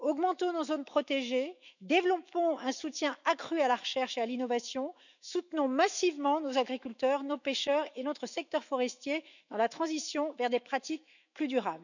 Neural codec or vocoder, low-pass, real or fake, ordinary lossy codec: autoencoder, 48 kHz, 128 numbers a frame, DAC-VAE, trained on Japanese speech; 7.2 kHz; fake; none